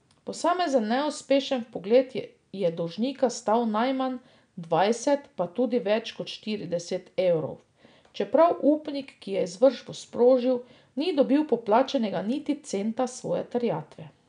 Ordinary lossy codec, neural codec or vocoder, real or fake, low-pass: none; none; real; 9.9 kHz